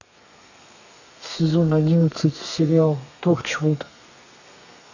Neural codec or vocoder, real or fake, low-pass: codec, 32 kHz, 1.9 kbps, SNAC; fake; 7.2 kHz